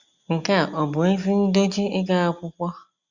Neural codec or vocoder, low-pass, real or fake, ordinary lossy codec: none; 7.2 kHz; real; Opus, 64 kbps